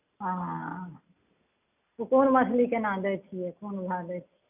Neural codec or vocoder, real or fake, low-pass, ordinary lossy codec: none; real; 3.6 kHz; none